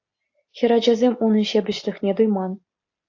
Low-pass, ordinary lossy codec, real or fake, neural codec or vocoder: 7.2 kHz; AAC, 48 kbps; real; none